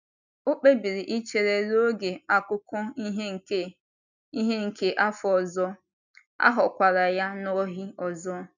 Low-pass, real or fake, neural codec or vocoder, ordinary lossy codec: 7.2 kHz; real; none; none